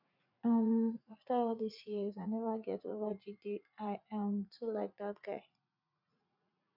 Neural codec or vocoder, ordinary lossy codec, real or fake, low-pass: vocoder, 44.1 kHz, 80 mel bands, Vocos; none; fake; 5.4 kHz